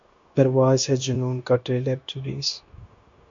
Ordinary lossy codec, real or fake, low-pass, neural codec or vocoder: MP3, 48 kbps; fake; 7.2 kHz; codec, 16 kHz, 0.9 kbps, LongCat-Audio-Codec